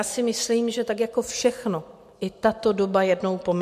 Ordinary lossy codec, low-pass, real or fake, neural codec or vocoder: MP3, 64 kbps; 14.4 kHz; real; none